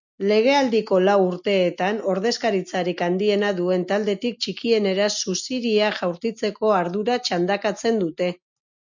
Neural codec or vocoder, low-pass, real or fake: none; 7.2 kHz; real